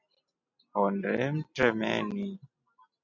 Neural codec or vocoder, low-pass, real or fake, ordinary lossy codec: none; 7.2 kHz; real; MP3, 64 kbps